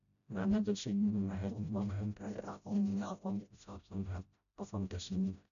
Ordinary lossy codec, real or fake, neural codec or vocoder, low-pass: none; fake; codec, 16 kHz, 0.5 kbps, FreqCodec, smaller model; 7.2 kHz